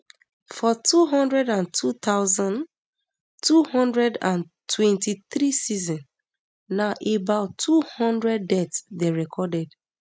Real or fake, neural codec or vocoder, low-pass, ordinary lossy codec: real; none; none; none